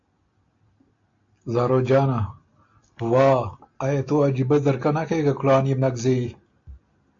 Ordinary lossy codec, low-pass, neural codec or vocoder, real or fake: AAC, 48 kbps; 7.2 kHz; none; real